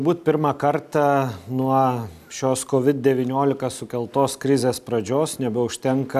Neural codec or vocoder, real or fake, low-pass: none; real; 14.4 kHz